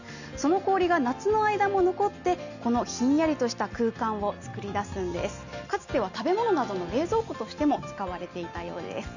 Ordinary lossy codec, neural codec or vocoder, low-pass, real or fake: none; none; 7.2 kHz; real